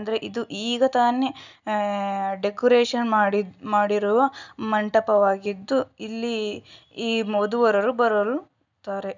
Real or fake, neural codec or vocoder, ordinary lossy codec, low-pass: real; none; none; 7.2 kHz